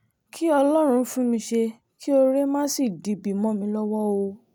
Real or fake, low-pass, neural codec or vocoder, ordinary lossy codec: real; none; none; none